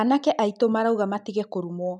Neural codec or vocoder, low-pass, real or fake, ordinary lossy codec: none; 10.8 kHz; real; none